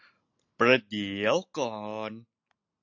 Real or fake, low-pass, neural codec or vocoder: real; 7.2 kHz; none